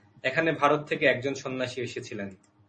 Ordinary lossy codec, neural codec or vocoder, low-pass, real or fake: MP3, 32 kbps; none; 10.8 kHz; real